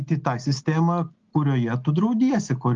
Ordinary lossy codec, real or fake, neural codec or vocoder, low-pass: Opus, 32 kbps; real; none; 7.2 kHz